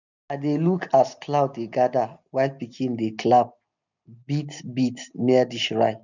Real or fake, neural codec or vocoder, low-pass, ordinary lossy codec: real; none; 7.2 kHz; none